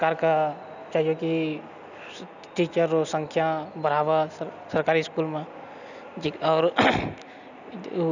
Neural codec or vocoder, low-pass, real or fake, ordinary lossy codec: none; 7.2 kHz; real; none